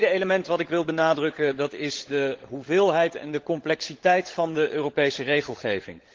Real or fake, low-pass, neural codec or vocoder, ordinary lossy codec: fake; 7.2 kHz; codec, 16 kHz, 16 kbps, FunCodec, trained on LibriTTS, 50 frames a second; Opus, 16 kbps